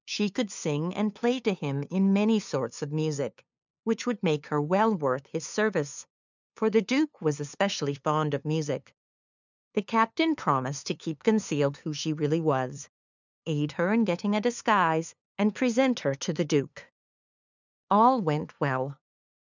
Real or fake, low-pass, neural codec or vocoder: fake; 7.2 kHz; codec, 16 kHz, 2 kbps, FunCodec, trained on LibriTTS, 25 frames a second